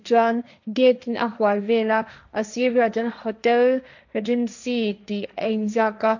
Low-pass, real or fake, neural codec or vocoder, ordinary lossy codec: 7.2 kHz; fake; codec, 16 kHz, 1.1 kbps, Voila-Tokenizer; none